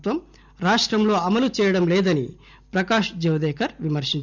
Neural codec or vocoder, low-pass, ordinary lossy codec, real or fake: none; 7.2 kHz; none; real